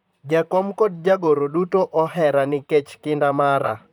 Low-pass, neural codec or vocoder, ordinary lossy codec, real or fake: 19.8 kHz; vocoder, 44.1 kHz, 128 mel bands, Pupu-Vocoder; none; fake